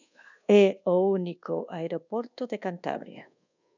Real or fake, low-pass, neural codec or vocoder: fake; 7.2 kHz; codec, 24 kHz, 1.2 kbps, DualCodec